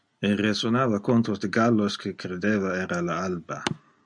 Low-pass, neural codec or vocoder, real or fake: 9.9 kHz; none; real